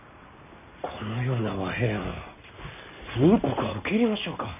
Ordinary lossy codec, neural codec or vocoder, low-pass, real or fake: none; none; 3.6 kHz; real